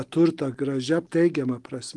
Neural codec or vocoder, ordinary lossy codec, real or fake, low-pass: none; Opus, 24 kbps; real; 10.8 kHz